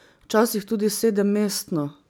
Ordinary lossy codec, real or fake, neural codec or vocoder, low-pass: none; real; none; none